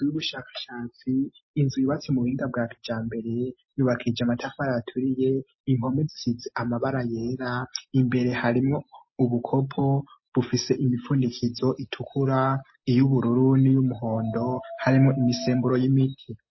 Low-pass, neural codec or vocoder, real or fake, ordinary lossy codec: 7.2 kHz; none; real; MP3, 24 kbps